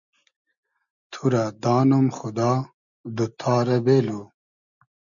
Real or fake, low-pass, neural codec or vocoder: real; 7.2 kHz; none